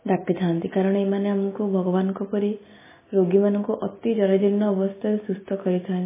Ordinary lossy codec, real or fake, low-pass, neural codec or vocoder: MP3, 16 kbps; real; 3.6 kHz; none